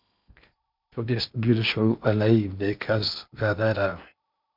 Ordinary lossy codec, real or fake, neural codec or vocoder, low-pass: MP3, 48 kbps; fake; codec, 16 kHz in and 24 kHz out, 0.8 kbps, FocalCodec, streaming, 65536 codes; 5.4 kHz